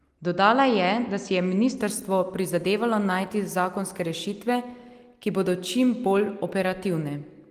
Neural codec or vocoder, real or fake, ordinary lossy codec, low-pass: none; real; Opus, 24 kbps; 14.4 kHz